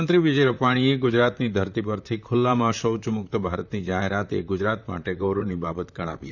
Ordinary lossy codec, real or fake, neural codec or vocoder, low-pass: none; fake; vocoder, 44.1 kHz, 128 mel bands, Pupu-Vocoder; 7.2 kHz